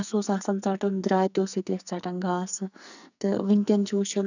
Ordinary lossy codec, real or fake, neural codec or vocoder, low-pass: none; fake; codec, 44.1 kHz, 2.6 kbps, SNAC; 7.2 kHz